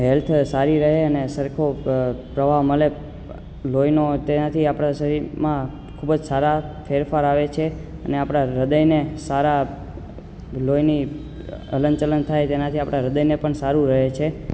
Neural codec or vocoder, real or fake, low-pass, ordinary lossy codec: none; real; none; none